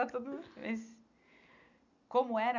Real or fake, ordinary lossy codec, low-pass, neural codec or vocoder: real; none; 7.2 kHz; none